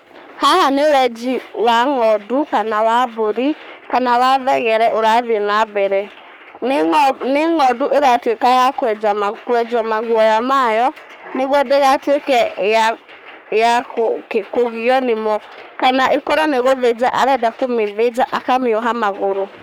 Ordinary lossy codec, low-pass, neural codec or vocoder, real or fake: none; none; codec, 44.1 kHz, 3.4 kbps, Pupu-Codec; fake